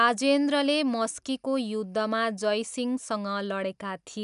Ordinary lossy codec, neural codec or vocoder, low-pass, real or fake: none; none; 10.8 kHz; real